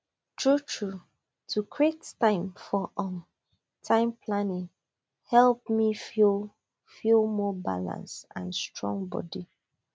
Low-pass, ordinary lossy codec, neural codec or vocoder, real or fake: none; none; none; real